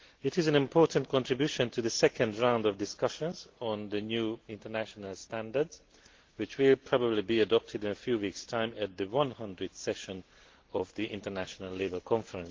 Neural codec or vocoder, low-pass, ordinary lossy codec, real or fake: none; 7.2 kHz; Opus, 16 kbps; real